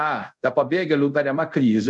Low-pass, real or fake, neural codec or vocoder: 10.8 kHz; fake; codec, 24 kHz, 0.5 kbps, DualCodec